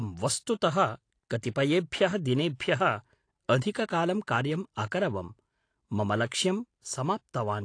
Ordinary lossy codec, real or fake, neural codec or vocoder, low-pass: AAC, 48 kbps; real; none; 9.9 kHz